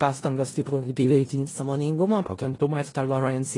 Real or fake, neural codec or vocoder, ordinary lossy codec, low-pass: fake; codec, 16 kHz in and 24 kHz out, 0.4 kbps, LongCat-Audio-Codec, four codebook decoder; AAC, 32 kbps; 10.8 kHz